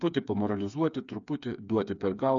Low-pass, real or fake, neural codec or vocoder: 7.2 kHz; fake; codec, 16 kHz, 4 kbps, FreqCodec, smaller model